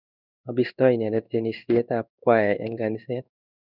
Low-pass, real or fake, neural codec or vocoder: 5.4 kHz; fake; codec, 16 kHz in and 24 kHz out, 1 kbps, XY-Tokenizer